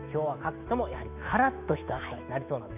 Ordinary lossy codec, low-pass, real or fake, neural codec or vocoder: none; 3.6 kHz; fake; autoencoder, 48 kHz, 128 numbers a frame, DAC-VAE, trained on Japanese speech